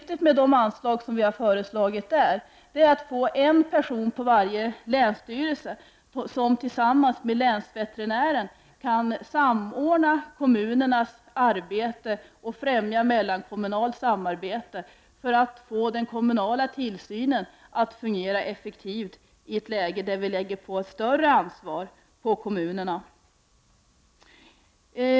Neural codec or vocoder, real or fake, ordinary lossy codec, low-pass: none; real; none; none